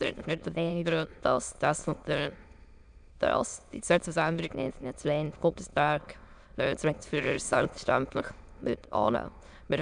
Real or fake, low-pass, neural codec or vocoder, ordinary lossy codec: fake; 9.9 kHz; autoencoder, 22.05 kHz, a latent of 192 numbers a frame, VITS, trained on many speakers; none